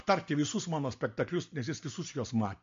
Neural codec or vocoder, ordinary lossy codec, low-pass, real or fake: none; MP3, 48 kbps; 7.2 kHz; real